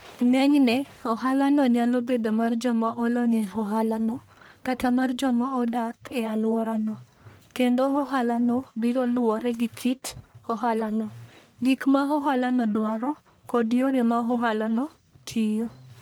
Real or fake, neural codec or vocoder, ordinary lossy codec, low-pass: fake; codec, 44.1 kHz, 1.7 kbps, Pupu-Codec; none; none